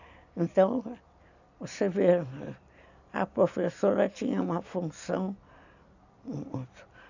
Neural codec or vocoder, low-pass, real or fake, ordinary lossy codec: none; 7.2 kHz; real; none